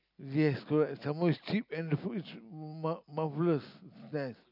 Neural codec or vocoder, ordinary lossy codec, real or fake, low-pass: none; none; real; 5.4 kHz